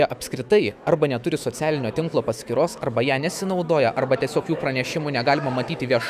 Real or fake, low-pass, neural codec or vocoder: fake; 14.4 kHz; autoencoder, 48 kHz, 128 numbers a frame, DAC-VAE, trained on Japanese speech